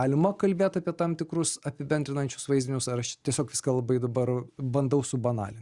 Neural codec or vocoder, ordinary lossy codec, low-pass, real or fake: none; Opus, 64 kbps; 10.8 kHz; real